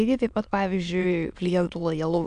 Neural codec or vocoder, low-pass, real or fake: autoencoder, 22.05 kHz, a latent of 192 numbers a frame, VITS, trained on many speakers; 9.9 kHz; fake